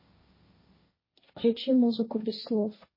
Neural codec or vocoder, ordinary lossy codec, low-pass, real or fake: codec, 16 kHz, 1.1 kbps, Voila-Tokenizer; MP3, 24 kbps; 5.4 kHz; fake